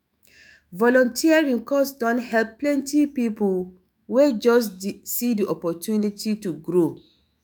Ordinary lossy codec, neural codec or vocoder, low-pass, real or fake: none; autoencoder, 48 kHz, 128 numbers a frame, DAC-VAE, trained on Japanese speech; none; fake